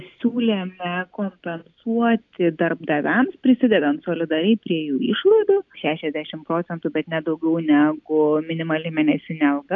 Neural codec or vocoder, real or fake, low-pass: none; real; 7.2 kHz